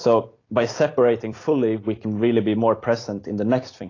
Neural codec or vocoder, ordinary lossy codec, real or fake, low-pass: vocoder, 44.1 kHz, 128 mel bands every 256 samples, BigVGAN v2; AAC, 32 kbps; fake; 7.2 kHz